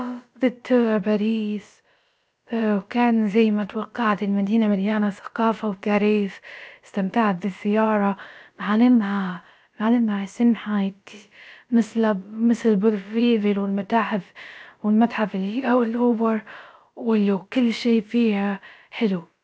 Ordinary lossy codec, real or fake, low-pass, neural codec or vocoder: none; fake; none; codec, 16 kHz, about 1 kbps, DyCAST, with the encoder's durations